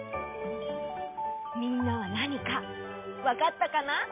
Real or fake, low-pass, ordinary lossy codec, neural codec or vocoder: real; 3.6 kHz; MP3, 32 kbps; none